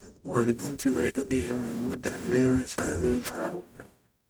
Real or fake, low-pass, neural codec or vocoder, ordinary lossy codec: fake; none; codec, 44.1 kHz, 0.9 kbps, DAC; none